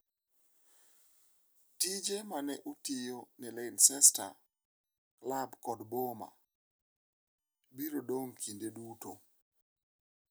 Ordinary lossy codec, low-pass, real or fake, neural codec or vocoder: none; none; real; none